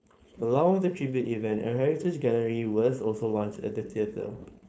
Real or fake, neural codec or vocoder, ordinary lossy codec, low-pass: fake; codec, 16 kHz, 4.8 kbps, FACodec; none; none